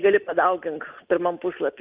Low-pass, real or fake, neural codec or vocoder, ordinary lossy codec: 3.6 kHz; real; none; Opus, 24 kbps